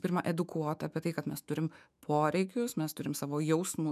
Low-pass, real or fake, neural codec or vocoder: 14.4 kHz; fake; autoencoder, 48 kHz, 128 numbers a frame, DAC-VAE, trained on Japanese speech